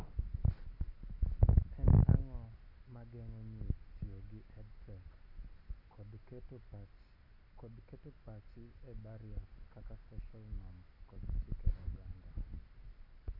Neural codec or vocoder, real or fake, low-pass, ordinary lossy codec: none; real; 5.4 kHz; none